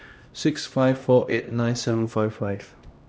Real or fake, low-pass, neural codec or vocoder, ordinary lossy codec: fake; none; codec, 16 kHz, 1 kbps, X-Codec, HuBERT features, trained on LibriSpeech; none